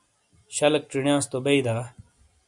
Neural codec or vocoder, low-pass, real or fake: none; 10.8 kHz; real